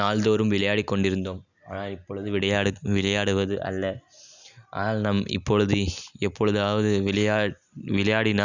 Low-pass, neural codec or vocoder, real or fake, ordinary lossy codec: 7.2 kHz; none; real; none